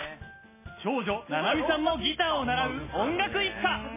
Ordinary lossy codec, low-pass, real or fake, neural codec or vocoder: MP3, 16 kbps; 3.6 kHz; real; none